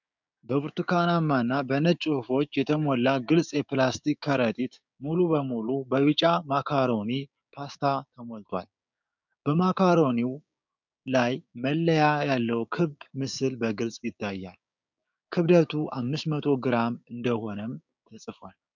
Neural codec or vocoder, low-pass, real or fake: codec, 16 kHz, 6 kbps, DAC; 7.2 kHz; fake